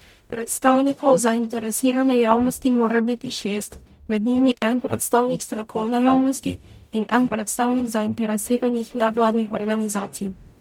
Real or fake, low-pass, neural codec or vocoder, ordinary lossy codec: fake; 19.8 kHz; codec, 44.1 kHz, 0.9 kbps, DAC; MP3, 96 kbps